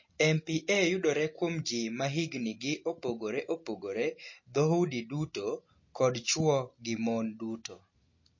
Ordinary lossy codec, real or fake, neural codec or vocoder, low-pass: MP3, 32 kbps; real; none; 7.2 kHz